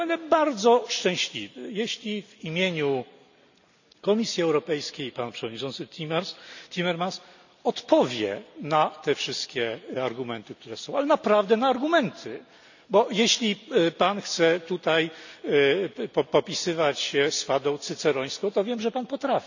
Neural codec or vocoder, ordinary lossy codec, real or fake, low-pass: none; none; real; 7.2 kHz